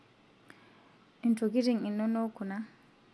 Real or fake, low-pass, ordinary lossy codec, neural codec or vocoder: real; none; none; none